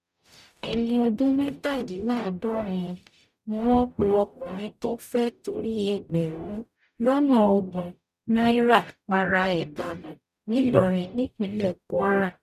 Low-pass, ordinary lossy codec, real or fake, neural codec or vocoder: 14.4 kHz; none; fake; codec, 44.1 kHz, 0.9 kbps, DAC